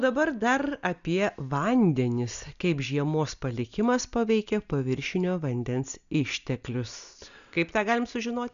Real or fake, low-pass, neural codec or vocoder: real; 7.2 kHz; none